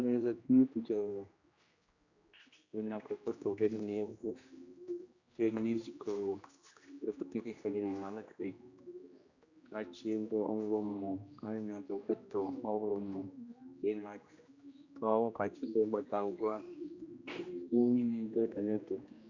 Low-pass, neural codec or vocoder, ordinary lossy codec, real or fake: 7.2 kHz; codec, 16 kHz, 1 kbps, X-Codec, HuBERT features, trained on general audio; Opus, 64 kbps; fake